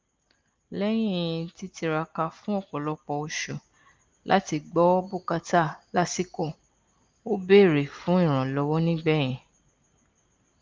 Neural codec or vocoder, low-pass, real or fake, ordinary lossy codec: none; 7.2 kHz; real; Opus, 32 kbps